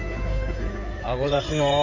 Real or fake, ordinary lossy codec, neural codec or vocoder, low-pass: fake; AAC, 48 kbps; codec, 16 kHz in and 24 kHz out, 2.2 kbps, FireRedTTS-2 codec; 7.2 kHz